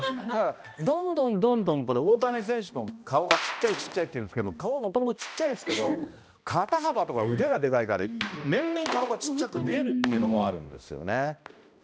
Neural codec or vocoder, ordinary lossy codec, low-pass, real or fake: codec, 16 kHz, 1 kbps, X-Codec, HuBERT features, trained on balanced general audio; none; none; fake